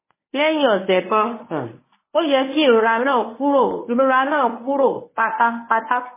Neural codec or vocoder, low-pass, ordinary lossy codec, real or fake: codec, 24 kHz, 0.9 kbps, WavTokenizer, medium speech release version 1; 3.6 kHz; MP3, 16 kbps; fake